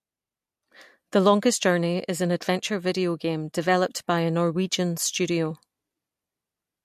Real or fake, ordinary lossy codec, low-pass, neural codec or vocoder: real; MP3, 64 kbps; 14.4 kHz; none